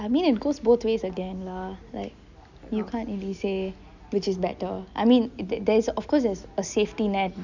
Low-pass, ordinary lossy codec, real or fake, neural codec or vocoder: 7.2 kHz; none; real; none